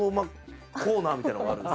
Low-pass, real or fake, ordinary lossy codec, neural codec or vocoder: none; real; none; none